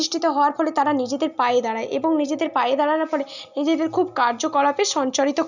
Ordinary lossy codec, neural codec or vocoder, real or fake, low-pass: none; none; real; 7.2 kHz